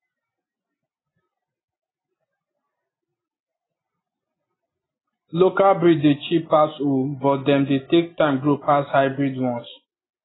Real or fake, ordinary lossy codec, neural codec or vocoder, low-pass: real; AAC, 16 kbps; none; 7.2 kHz